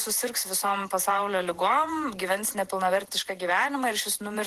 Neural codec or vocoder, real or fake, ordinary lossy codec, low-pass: vocoder, 48 kHz, 128 mel bands, Vocos; fake; Opus, 16 kbps; 14.4 kHz